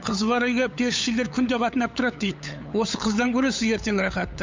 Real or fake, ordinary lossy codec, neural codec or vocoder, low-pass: fake; MP3, 64 kbps; codec, 16 kHz, 8 kbps, FunCodec, trained on LibriTTS, 25 frames a second; 7.2 kHz